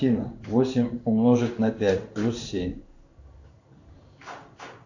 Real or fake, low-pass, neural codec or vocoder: fake; 7.2 kHz; codec, 16 kHz in and 24 kHz out, 1 kbps, XY-Tokenizer